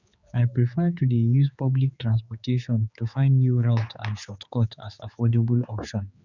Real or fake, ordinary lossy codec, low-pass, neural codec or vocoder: fake; Opus, 64 kbps; 7.2 kHz; codec, 16 kHz, 4 kbps, X-Codec, HuBERT features, trained on general audio